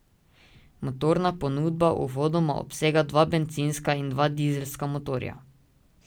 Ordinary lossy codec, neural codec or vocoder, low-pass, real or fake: none; none; none; real